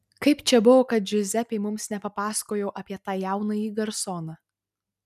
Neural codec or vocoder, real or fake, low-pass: none; real; 14.4 kHz